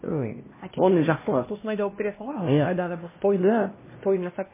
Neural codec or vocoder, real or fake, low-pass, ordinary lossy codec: codec, 16 kHz, 1 kbps, X-Codec, HuBERT features, trained on LibriSpeech; fake; 3.6 kHz; MP3, 16 kbps